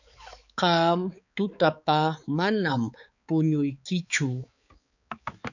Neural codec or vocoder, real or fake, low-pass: codec, 16 kHz, 4 kbps, X-Codec, HuBERT features, trained on balanced general audio; fake; 7.2 kHz